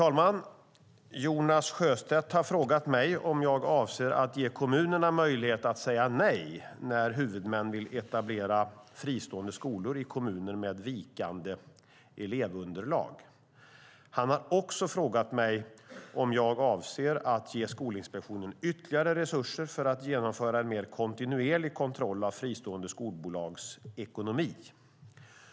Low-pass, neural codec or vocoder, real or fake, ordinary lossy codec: none; none; real; none